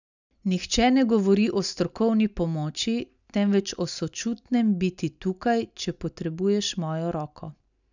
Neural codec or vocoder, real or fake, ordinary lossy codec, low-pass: none; real; none; 7.2 kHz